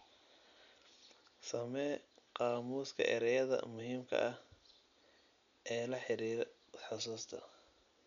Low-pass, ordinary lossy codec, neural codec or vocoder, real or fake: 7.2 kHz; none; none; real